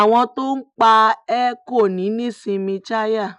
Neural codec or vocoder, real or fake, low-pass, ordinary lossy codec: none; real; 10.8 kHz; none